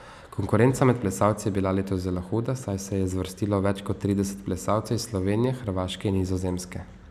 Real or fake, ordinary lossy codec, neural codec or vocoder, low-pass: real; none; none; 14.4 kHz